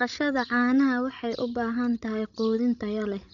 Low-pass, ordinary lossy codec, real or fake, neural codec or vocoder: 7.2 kHz; none; fake; codec, 16 kHz, 16 kbps, FreqCodec, larger model